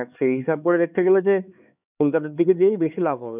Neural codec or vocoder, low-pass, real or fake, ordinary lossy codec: codec, 16 kHz, 4 kbps, X-Codec, HuBERT features, trained on LibriSpeech; 3.6 kHz; fake; none